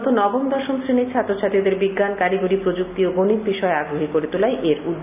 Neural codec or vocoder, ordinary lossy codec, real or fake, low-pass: none; none; real; 3.6 kHz